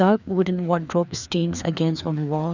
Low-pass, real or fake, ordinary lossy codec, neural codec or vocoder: 7.2 kHz; fake; none; codec, 16 kHz, 2 kbps, FreqCodec, larger model